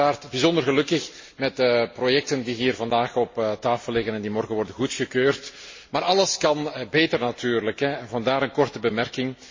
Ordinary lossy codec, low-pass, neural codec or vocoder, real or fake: MP3, 32 kbps; 7.2 kHz; none; real